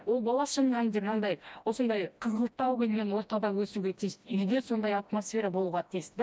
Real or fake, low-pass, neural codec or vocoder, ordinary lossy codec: fake; none; codec, 16 kHz, 1 kbps, FreqCodec, smaller model; none